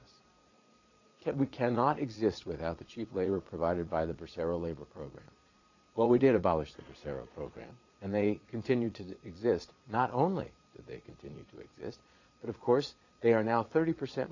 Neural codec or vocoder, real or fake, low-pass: vocoder, 22.05 kHz, 80 mel bands, Vocos; fake; 7.2 kHz